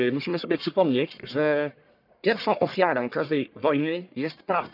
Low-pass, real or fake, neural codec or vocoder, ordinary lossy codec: 5.4 kHz; fake; codec, 44.1 kHz, 1.7 kbps, Pupu-Codec; none